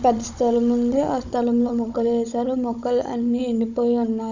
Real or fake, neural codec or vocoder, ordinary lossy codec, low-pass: fake; codec, 16 kHz, 16 kbps, FunCodec, trained on Chinese and English, 50 frames a second; none; 7.2 kHz